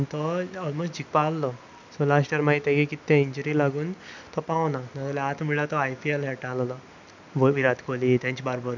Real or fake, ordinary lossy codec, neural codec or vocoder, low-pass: real; none; none; 7.2 kHz